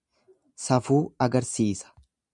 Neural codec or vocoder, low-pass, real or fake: none; 10.8 kHz; real